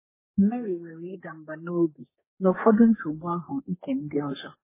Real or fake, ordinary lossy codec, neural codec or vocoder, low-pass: fake; MP3, 16 kbps; codec, 32 kHz, 1.9 kbps, SNAC; 3.6 kHz